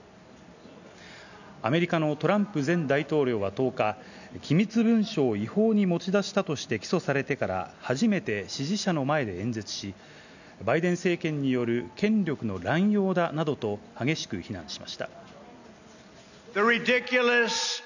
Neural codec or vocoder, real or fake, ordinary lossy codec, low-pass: none; real; none; 7.2 kHz